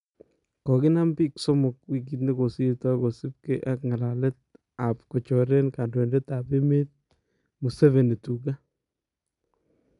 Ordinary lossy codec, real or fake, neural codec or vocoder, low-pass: none; real; none; 10.8 kHz